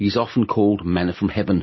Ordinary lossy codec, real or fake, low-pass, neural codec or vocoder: MP3, 24 kbps; real; 7.2 kHz; none